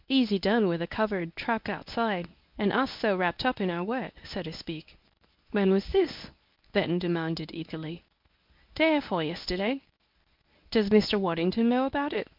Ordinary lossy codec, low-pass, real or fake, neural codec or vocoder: MP3, 48 kbps; 5.4 kHz; fake; codec, 24 kHz, 0.9 kbps, WavTokenizer, medium speech release version 1